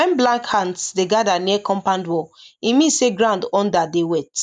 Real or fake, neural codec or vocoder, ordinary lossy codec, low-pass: real; none; none; 9.9 kHz